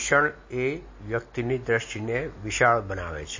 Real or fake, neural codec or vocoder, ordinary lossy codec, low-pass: real; none; MP3, 32 kbps; 7.2 kHz